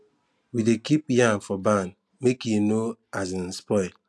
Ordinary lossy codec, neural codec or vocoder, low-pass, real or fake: none; none; none; real